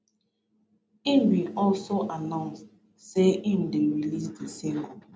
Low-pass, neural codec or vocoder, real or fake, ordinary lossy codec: none; none; real; none